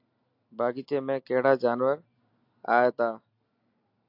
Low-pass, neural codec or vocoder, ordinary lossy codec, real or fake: 5.4 kHz; none; AAC, 48 kbps; real